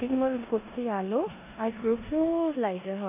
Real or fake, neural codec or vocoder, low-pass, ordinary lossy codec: fake; codec, 16 kHz in and 24 kHz out, 0.9 kbps, LongCat-Audio-Codec, four codebook decoder; 3.6 kHz; none